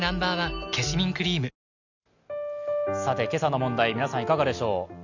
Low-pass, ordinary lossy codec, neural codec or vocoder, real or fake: 7.2 kHz; none; none; real